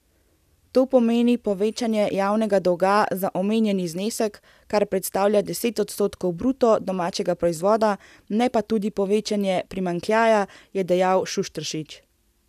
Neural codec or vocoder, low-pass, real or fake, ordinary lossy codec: none; 14.4 kHz; real; none